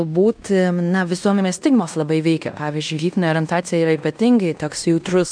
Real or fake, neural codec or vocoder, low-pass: fake; codec, 16 kHz in and 24 kHz out, 0.9 kbps, LongCat-Audio-Codec, fine tuned four codebook decoder; 9.9 kHz